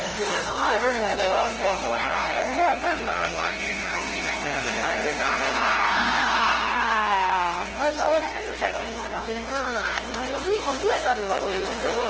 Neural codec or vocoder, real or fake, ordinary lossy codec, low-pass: codec, 16 kHz, 0.5 kbps, FunCodec, trained on LibriTTS, 25 frames a second; fake; Opus, 16 kbps; 7.2 kHz